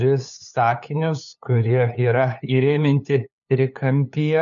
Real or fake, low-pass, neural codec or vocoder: fake; 7.2 kHz; codec, 16 kHz, 8 kbps, FunCodec, trained on LibriTTS, 25 frames a second